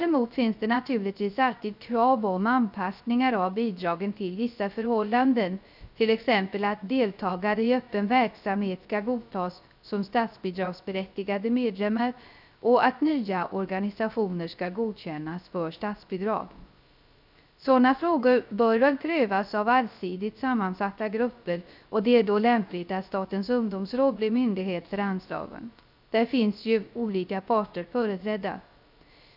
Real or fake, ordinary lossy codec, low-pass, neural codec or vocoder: fake; none; 5.4 kHz; codec, 16 kHz, 0.3 kbps, FocalCodec